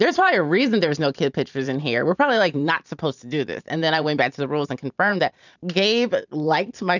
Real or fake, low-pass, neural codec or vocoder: fake; 7.2 kHz; vocoder, 44.1 kHz, 128 mel bands every 512 samples, BigVGAN v2